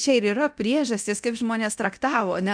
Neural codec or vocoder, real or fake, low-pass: codec, 24 kHz, 0.9 kbps, DualCodec; fake; 9.9 kHz